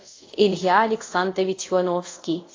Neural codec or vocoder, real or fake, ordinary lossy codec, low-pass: codec, 24 kHz, 0.5 kbps, DualCodec; fake; AAC, 32 kbps; 7.2 kHz